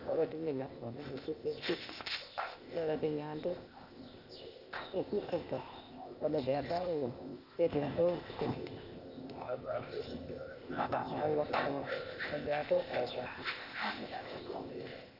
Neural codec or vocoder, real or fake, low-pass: codec, 16 kHz, 0.8 kbps, ZipCodec; fake; 5.4 kHz